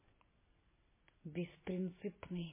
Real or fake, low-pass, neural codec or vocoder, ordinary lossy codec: real; 3.6 kHz; none; MP3, 16 kbps